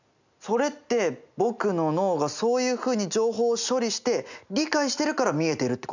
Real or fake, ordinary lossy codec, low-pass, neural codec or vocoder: real; none; 7.2 kHz; none